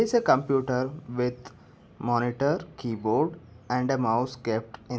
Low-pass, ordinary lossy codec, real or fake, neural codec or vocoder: none; none; real; none